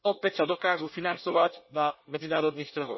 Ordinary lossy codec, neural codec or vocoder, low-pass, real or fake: MP3, 24 kbps; codec, 24 kHz, 1 kbps, SNAC; 7.2 kHz; fake